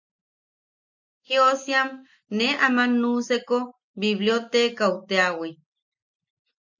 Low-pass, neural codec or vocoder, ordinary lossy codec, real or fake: 7.2 kHz; none; MP3, 48 kbps; real